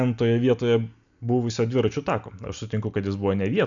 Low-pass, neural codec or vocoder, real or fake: 7.2 kHz; none; real